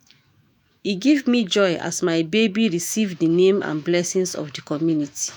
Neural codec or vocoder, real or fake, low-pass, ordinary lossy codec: autoencoder, 48 kHz, 128 numbers a frame, DAC-VAE, trained on Japanese speech; fake; none; none